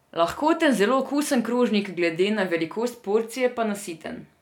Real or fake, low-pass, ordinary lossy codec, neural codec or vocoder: real; 19.8 kHz; none; none